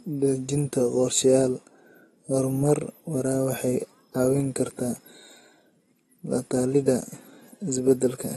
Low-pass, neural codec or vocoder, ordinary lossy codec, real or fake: 19.8 kHz; none; AAC, 32 kbps; real